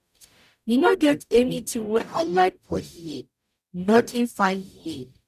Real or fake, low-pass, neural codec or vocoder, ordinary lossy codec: fake; 14.4 kHz; codec, 44.1 kHz, 0.9 kbps, DAC; none